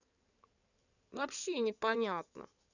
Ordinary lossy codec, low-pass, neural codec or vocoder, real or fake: none; 7.2 kHz; codec, 16 kHz in and 24 kHz out, 2.2 kbps, FireRedTTS-2 codec; fake